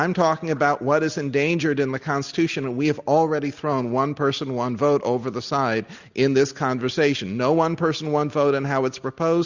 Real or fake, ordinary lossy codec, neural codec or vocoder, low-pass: real; Opus, 64 kbps; none; 7.2 kHz